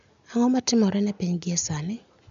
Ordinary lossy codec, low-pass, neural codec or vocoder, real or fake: MP3, 64 kbps; 7.2 kHz; none; real